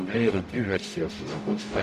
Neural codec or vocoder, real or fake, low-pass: codec, 44.1 kHz, 0.9 kbps, DAC; fake; 14.4 kHz